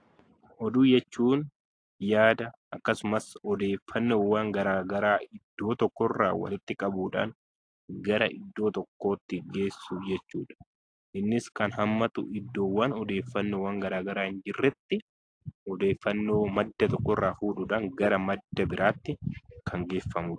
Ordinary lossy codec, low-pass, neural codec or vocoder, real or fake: AAC, 48 kbps; 9.9 kHz; none; real